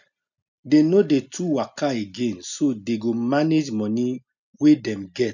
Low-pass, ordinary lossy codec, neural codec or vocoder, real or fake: 7.2 kHz; none; none; real